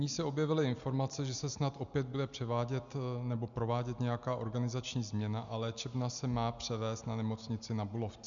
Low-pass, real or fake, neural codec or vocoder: 7.2 kHz; real; none